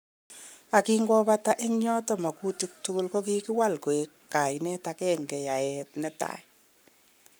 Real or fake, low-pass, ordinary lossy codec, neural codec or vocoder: fake; none; none; codec, 44.1 kHz, 7.8 kbps, Pupu-Codec